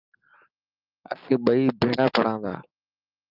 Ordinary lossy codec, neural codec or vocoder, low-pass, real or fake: Opus, 32 kbps; none; 5.4 kHz; real